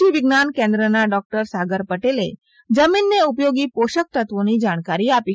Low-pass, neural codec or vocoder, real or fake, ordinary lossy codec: none; none; real; none